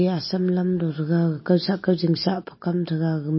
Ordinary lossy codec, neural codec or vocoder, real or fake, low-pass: MP3, 24 kbps; none; real; 7.2 kHz